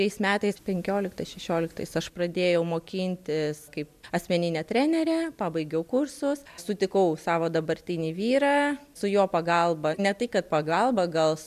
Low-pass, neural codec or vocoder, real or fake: 14.4 kHz; none; real